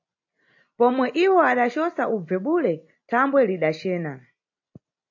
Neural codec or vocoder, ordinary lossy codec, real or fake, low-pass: none; AAC, 48 kbps; real; 7.2 kHz